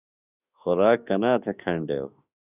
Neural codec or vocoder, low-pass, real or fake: autoencoder, 48 kHz, 32 numbers a frame, DAC-VAE, trained on Japanese speech; 3.6 kHz; fake